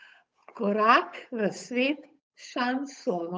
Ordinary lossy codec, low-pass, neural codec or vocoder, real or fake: none; none; codec, 16 kHz, 8 kbps, FunCodec, trained on Chinese and English, 25 frames a second; fake